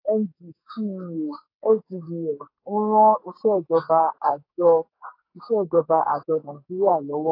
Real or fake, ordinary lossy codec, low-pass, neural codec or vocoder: fake; AAC, 32 kbps; 5.4 kHz; codec, 44.1 kHz, 2.6 kbps, SNAC